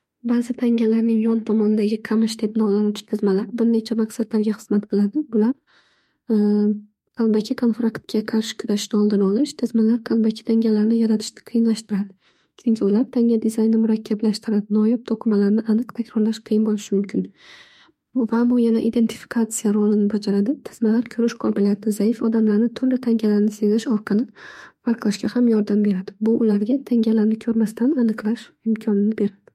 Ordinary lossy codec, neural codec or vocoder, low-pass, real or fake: MP3, 64 kbps; autoencoder, 48 kHz, 32 numbers a frame, DAC-VAE, trained on Japanese speech; 19.8 kHz; fake